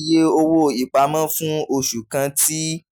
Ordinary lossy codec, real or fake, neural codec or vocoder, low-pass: none; real; none; none